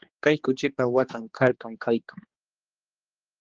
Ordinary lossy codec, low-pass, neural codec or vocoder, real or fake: Opus, 16 kbps; 7.2 kHz; codec, 16 kHz, 2 kbps, X-Codec, HuBERT features, trained on general audio; fake